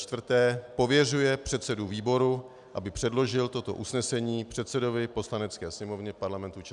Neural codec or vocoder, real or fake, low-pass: none; real; 10.8 kHz